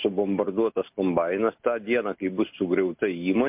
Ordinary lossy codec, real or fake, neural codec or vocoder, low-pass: MP3, 32 kbps; real; none; 3.6 kHz